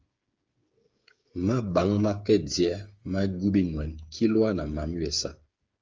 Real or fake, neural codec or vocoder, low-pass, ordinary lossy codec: fake; codec, 16 kHz, 8 kbps, FreqCodec, smaller model; 7.2 kHz; Opus, 32 kbps